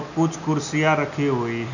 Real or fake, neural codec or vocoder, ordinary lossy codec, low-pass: real; none; none; 7.2 kHz